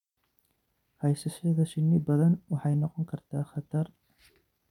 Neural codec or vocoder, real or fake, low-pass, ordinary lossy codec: none; real; 19.8 kHz; none